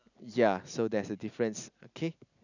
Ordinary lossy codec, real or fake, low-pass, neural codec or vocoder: none; real; 7.2 kHz; none